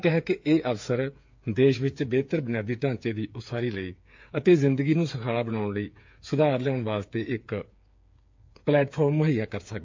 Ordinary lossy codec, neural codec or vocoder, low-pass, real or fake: MP3, 48 kbps; codec, 16 kHz, 8 kbps, FreqCodec, smaller model; 7.2 kHz; fake